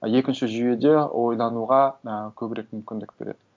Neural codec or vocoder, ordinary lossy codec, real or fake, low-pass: none; none; real; none